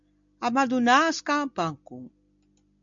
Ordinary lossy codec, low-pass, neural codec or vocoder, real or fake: MP3, 64 kbps; 7.2 kHz; none; real